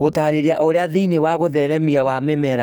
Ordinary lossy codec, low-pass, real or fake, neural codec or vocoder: none; none; fake; codec, 44.1 kHz, 2.6 kbps, SNAC